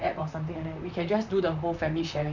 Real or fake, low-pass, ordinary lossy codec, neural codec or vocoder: fake; 7.2 kHz; none; vocoder, 44.1 kHz, 128 mel bands, Pupu-Vocoder